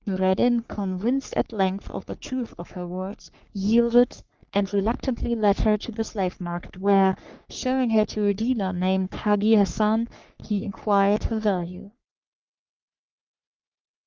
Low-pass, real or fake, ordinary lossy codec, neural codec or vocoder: 7.2 kHz; fake; Opus, 32 kbps; codec, 44.1 kHz, 3.4 kbps, Pupu-Codec